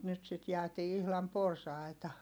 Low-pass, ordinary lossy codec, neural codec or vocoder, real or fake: none; none; none; real